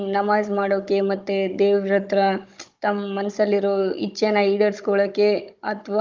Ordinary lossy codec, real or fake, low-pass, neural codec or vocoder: Opus, 24 kbps; fake; 7.2 kHz; codec, 16 kHz, 16 kbps, FunCodec, trained on Chinese and English, 50 frames a second